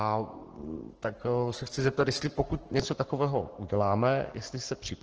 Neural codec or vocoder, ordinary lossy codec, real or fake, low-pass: codec, 44.1 kHz, 7.8 kbps, Pupu-Codec; Opus, 16 kbps; fake; 7.2 kHz